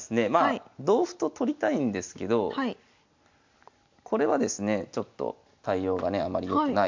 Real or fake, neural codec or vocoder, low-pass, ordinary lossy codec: real; none; 7.2 kHz; MP3, 64 kbps